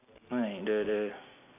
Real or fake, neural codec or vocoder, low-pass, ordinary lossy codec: real; none; 3.6 kHz; AAC, 32 kbps